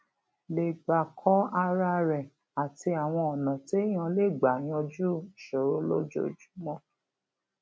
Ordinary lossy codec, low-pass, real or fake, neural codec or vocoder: none; none; real; none